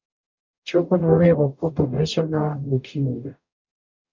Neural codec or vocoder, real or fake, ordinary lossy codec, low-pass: codec, 44.1 kHz, 0.9 kbps, DAC; fake; MP3, 64 kbps; 7.2 kHz